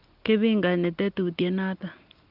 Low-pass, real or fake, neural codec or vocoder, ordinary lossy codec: 5.4 kHz; real; none; Opus, 32 kbps